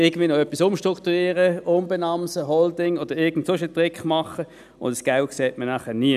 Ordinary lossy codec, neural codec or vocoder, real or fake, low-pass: none; none; real; 14.4 kHz